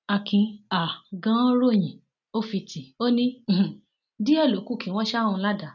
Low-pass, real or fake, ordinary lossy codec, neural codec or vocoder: 7.2 kHz; real; none; none